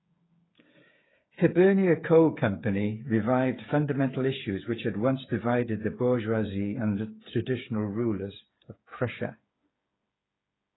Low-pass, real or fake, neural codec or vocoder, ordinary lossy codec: 7.2 kHz; fake; codec, 16 kHz, 8 kbps, FreqCodec, smaller model; AAC, 16 kbps